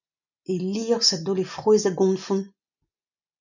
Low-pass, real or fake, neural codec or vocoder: 7.2 kHz; real; none